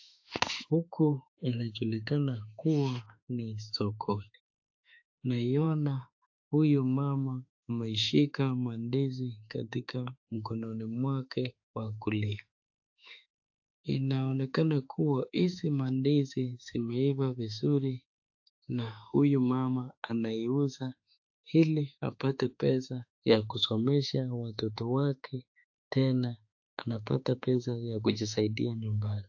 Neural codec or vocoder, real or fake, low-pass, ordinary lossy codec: autoencoder, 48 kHz, 32 numbers a frame, DAC-VAE, trained on Japanese speech; fake; 7.2 kHz; AAC, 48 kbps